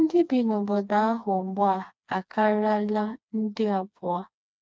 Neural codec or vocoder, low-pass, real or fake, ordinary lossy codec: codec, 16 kHz, 2 kbps, FreqCodec, smaller model; none; fake; none